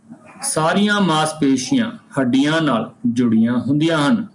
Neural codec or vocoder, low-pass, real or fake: none; 10.8 kHz; real